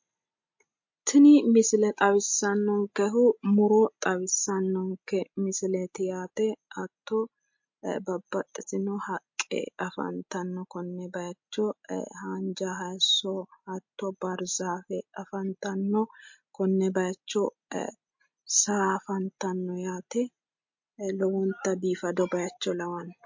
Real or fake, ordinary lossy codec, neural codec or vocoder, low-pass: real; MP3, 48 kbps; none; 7.2 kHz